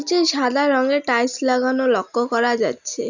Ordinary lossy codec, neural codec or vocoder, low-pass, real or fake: none; none; 7.2 kHz; real